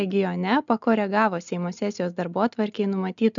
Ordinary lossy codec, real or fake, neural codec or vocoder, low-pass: MP3, 96 kbps; real; none; 7.2 kHz